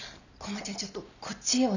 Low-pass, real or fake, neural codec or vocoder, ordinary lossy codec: 7.2 kHz; real; none; none